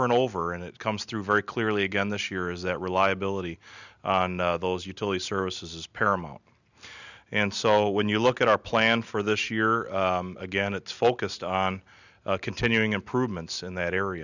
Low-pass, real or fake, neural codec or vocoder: 7.2 kHz; real; none